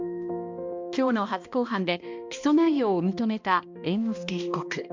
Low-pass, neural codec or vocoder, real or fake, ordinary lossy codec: 7.2 kHz; codec, 16 kHz, 1 kbps, X-Codec, HuBERT features, trained on balanced general audio; fake; MP3, 64 kbps